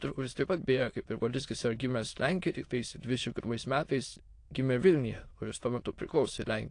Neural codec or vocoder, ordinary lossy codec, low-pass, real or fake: autoencoder, 22.05 kHz, a latent of 192 numbers a frame, VITS, trained on many speakers; AAC, 48 kbps; 9.9 kHz; fake